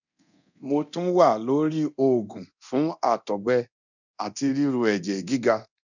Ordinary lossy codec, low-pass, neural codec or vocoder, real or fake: none; 7.2 kHz; codec, 24 kHz, 0.9 kbps, DualCodec; fake